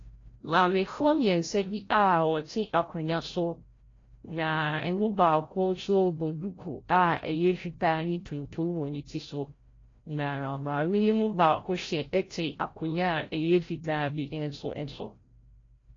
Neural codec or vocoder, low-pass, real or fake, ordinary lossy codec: codec, 16 kHz, 0.5 kbps, FreqCodec, larger model; 7.2 kHz; fake; AAC, 32 kbps